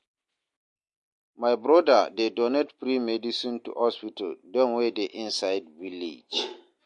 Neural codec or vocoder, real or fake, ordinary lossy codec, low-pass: none; real; MP3, 48 kbps; 10.8 kHz